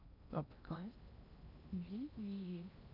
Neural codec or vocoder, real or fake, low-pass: codec, 16 kHz in and 24 kHz out, 0.8 kbps, FocalCodec, streaming, 65536 codes; fake; 5.4 kHz